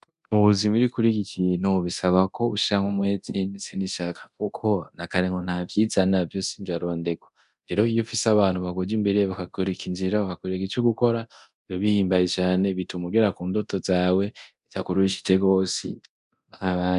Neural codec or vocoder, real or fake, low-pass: codec, 24 kHz, 0.9 kbps, DualCodec; fake; 10.8 kHz